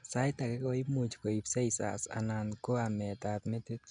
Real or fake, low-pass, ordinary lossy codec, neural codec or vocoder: real; 9.9 kHz; none; none